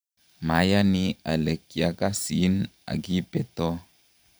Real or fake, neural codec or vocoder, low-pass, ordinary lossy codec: fake; vocoder, 44.1 kHz, 128 mel bands every 512 samples, BigVGAN v2; none; none